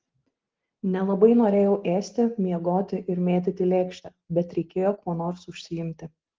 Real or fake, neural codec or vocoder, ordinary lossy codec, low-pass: real; none; Opus, 16 kbps; 7.2 kHz